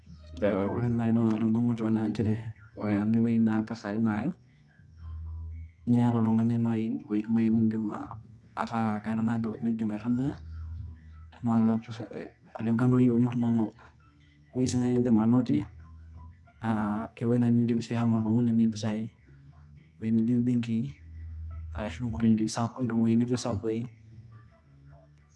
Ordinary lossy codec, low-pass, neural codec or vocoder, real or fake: none; none; codec, 24 kHz, 0.9 kbps, WavTokenizer, medium music audio release; fake